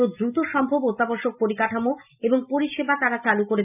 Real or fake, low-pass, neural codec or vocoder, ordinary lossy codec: real; 3.6 kHz; none; none